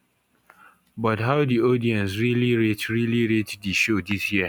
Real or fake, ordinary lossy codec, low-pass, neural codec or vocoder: real; none; 19.8 kHz; none